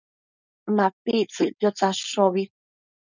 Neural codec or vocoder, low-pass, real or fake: codec, 16 kHz, 4.8 kbps, FACodec; 7.2 kHz; fake